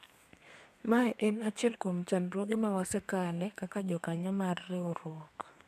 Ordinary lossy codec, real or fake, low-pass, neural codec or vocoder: none; fake; 14.4 kHz; codec, 32 kHz, 1.9 kbps, SNAC